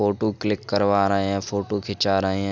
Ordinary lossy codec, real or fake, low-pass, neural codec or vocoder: none; real; 7.2 kHz; none